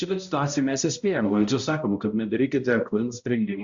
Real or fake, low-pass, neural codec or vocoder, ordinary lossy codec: fake; 7.2 kHz; codec, 16 kHz, 0.5 kbps, X-Codec, HuBERT features, trained on balanced general audio; Opus, 64 kbps